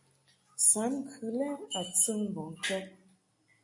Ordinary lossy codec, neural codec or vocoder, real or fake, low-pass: AAC, 64 kbps; none; real; 10.8 kHz